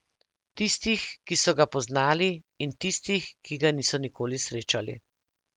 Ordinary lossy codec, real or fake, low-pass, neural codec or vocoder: Opus, 32 kbps; real; 19.8 kHz; none